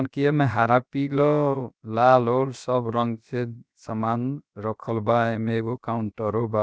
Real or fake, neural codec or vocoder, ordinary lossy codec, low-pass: fake; codec, 16 kHz, about 1 kbps, DyCAST, with the encoder's durations; none; none